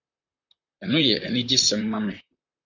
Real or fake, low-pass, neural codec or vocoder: fake; 7.2 kHz; vocoder, 44.1 kHz, 128 mel bands, Pupu-Vocoder